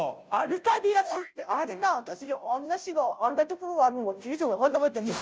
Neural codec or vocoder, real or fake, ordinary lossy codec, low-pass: codec, 16 kHz, 0.5 kbps, FunCodec, trained on Chinese and English, 25 frames a second; fake; none; none